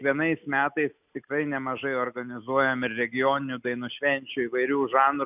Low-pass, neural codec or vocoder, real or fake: 3.6 kHz; none; real